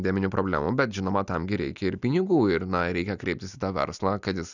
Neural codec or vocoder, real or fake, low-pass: none; real; 7.2 kHz